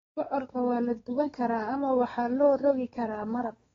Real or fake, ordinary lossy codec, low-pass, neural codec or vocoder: fake; AAC, 24 kbps; 7.2 kHz; codec, 16 kHz, 4 kbps, X-Codec, WavLM features, trained on Multilingual LibriSpeech